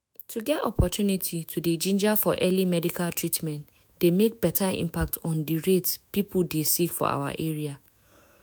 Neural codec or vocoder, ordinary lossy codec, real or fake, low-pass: autoencoder, 48 kHz, 128 numbers a frame, DAC-VAE, trained on Japanese speech; none; fake; none